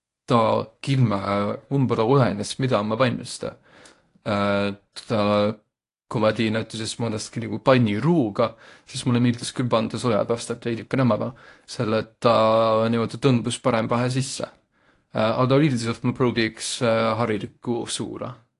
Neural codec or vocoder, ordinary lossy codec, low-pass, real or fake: codec, 24 kHz, 0.9 kbps, WavTokenizer, medium speech release version 1; AAC, 48 kbps; 10.8 kHz; fake